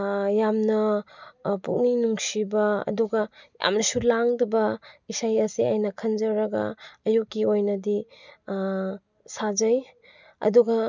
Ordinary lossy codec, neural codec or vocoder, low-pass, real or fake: none; none; 7.2 kHz; real